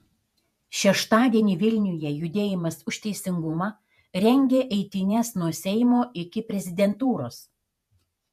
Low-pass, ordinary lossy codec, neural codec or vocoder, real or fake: 14.4 kHz; MP3, 96 kbps; vocoder, 48 kHz, 128 mel bands, Vocos; fake